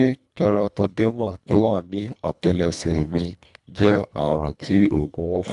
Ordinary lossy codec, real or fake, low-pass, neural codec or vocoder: none; fake; 10.8 kHz; codec, 24 kHz, 1.5 kbps, HILCodec